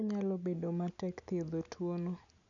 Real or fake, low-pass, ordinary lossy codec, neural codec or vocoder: real; 7.2 kHz; none; none